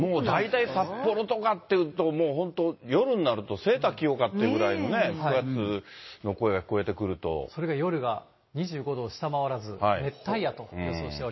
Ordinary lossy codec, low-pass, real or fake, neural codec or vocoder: MP3, 24 kbps; 7.2 kHz; real; none